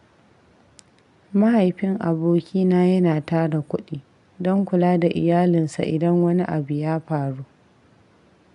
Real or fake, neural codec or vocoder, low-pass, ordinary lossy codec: real; none; 10.8 kHz; none